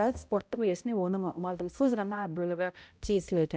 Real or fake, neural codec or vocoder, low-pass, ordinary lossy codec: fake; codec, 16 kHz, 0.5 kbps, X-Codec, HuBERT features, trained on balanced general audio; none; none